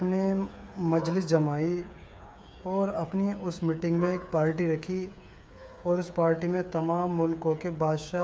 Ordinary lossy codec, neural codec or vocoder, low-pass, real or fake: none; codec, 16 kHz, 8 kbps, FreqCodec, smaller model; none; fake